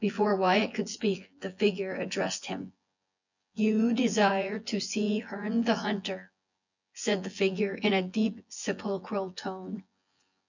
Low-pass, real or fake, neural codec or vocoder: 7.2 kHz; fake; vocoder, 24 kHz, 100 mel bands, Vocos